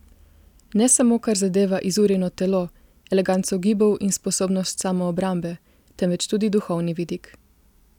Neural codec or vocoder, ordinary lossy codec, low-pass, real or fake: none; none; 19.8 kHz; real